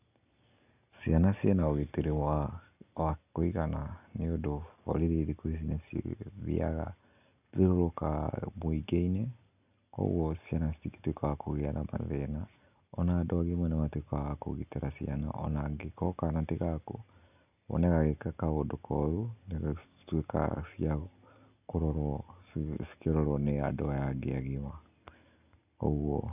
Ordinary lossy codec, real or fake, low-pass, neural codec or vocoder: none; real; 3.6 kHz; none